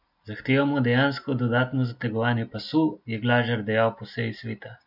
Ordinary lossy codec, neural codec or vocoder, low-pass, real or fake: none; none; 5.4 kHz; real